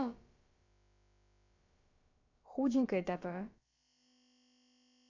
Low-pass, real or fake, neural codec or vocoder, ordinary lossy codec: 7.2 kHz; fake; codec, 16 kHz, about 1 kbps, DyCAST, with the encoder's durations; AAC, 48 kbps